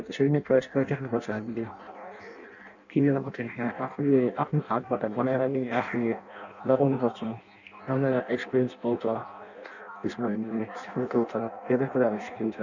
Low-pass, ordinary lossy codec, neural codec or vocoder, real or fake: 7.2 kHz; none; codec, 16 kHz in and 24 kHz out, 0.6 kbps, FireRedTTS-2 codec; fake